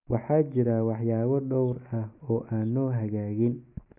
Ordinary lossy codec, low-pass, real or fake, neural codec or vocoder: none; 3.6 kHz; real; none